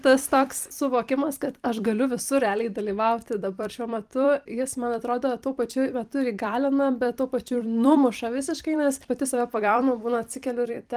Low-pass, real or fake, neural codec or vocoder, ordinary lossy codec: 14.4 kHz; fake; vocoder, 44.1 kHz, 128 mel bands every 256 samples, BigVGAN v2; Opus, 32 kbps